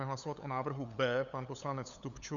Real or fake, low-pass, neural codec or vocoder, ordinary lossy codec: fake; 7.2 kHz; codec, 16 kHz, 8 kbps, FunCodec, trained on LibriTTS, 25 frames a second; MP3, 96 kbps